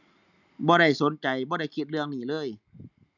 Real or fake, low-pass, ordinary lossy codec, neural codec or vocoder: real; 7.2 kHz; none; none